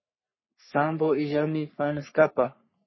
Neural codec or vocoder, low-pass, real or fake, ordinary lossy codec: codec, 44.1 kHz, 3.4 kbps, Pupu-Codec; 7.2 kHz; fake; MP3, 24 kbps